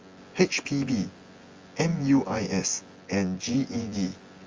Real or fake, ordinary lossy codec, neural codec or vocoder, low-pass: fake; Opus, 32 kbps; vocoder, 24 kHz, 100 mel bands, Vocos; 7.2 kHz